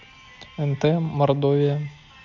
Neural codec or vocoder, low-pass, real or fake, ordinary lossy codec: none; 7.2 kHz; real; none